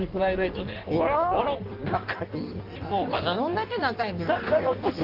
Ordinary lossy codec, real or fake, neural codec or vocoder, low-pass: Opus, 32 kbps; fake; codec, 16 kHz in and 24 kHz out, 1.1 kbps, FireRedTTS-2 codec; 5.4 kHz